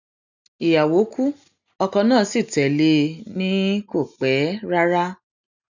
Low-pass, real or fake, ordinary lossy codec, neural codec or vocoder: 7.2 kHz; real; none; none